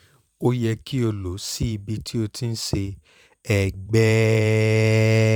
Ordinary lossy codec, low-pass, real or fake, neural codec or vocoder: none; 19.8 kHz; fake; vocoder, 44.1 kHz, 128 mel bands every 512 samples, BigVGAN v2